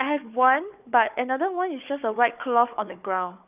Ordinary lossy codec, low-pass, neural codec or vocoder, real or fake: none; 3.6 kHz; codec, 16 kHz, 4 kbps, FunCodec, trained on Chinese and English, 50 frames a second; fake